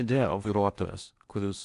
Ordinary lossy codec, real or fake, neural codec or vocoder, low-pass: Opus, 64 kbps; fake; codec, 16 kHz in and 24 kHz out, 0.6 kbps, FocalCodec, streaming, 4096 codes; 10.8 kHz